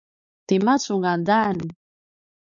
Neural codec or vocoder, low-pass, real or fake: codec, 16 kHz, 4 kbps, X-Codec, WavLM features, trained on Multilingual LibriSpeech; 7.2 kHz; fake